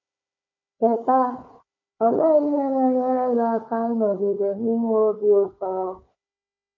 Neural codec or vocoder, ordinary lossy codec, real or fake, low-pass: codec, 16 kHz, 4 kbps, FunCodec, trained on Chinese and English, 50 frames a second; none; fake; 7.2 kHz